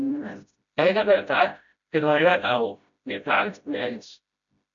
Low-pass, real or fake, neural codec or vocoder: 7.2 kHz; fake; codec, 16 kHz, 0.5 kbps, FreqCodec, smaller model